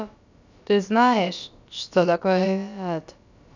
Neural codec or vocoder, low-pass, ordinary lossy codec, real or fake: codec, 16 kHz, about 1 kbps, DyCAST, with the encoder's durations; 7.2 kHz; none; fake